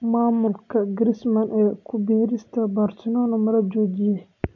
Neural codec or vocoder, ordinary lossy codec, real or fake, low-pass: none; none; real; 7.2 kHz